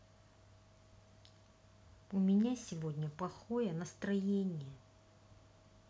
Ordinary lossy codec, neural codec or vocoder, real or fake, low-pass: none; none; real; none